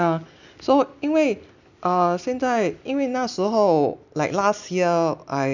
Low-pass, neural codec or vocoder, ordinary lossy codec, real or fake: 7.2 kHz; none; none; real